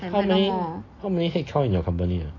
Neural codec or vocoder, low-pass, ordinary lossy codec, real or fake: none; 7.2 kHz; AAC, 32 kbps; real